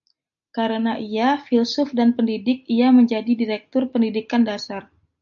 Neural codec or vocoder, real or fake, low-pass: none; real; 7.2 kHz